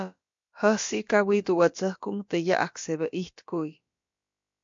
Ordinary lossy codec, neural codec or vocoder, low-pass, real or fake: MP3, 48 kbps; codec, 16 kHz, about 1 kbps, DyCAST, with the encoder's durations; 7.2 kHz; fake